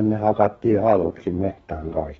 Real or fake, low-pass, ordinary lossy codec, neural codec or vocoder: fake; 14.4 kHz; AAC, 24 kbps; codec, 32 kHz, 1.9 kbps, SNAC